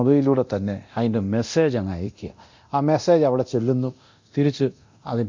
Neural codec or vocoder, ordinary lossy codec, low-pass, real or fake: codec, 24 kHz, 0.9 kbps, DualCodec; MP3, 48 kbps; 7.2 kHz; fake